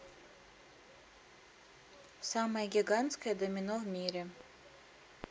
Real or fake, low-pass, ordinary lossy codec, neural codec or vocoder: real; none; none; none